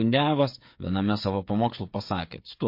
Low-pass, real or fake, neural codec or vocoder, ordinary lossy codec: 5.4 kHz; fake; codec, 16 kHz, 8 kbps, FreqCodec, smaller model; MP3, 32 kbps